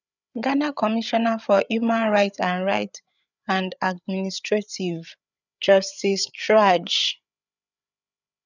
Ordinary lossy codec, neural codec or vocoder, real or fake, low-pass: none; codec, 16 kHz, 8 kbps, FreqCodec, larger model; fake; 7.2 kHz